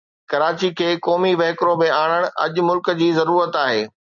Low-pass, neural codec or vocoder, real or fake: 7.2 kHz; none; real